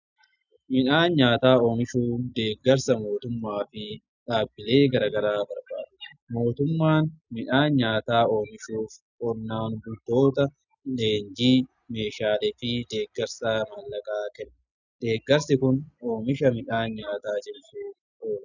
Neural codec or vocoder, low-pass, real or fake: none; 7.2 kHz; real